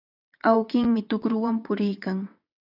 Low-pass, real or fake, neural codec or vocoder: 5.4 kHz; fake; vocoder, 22.05 kHz, 80 mel bands, WaveNeXt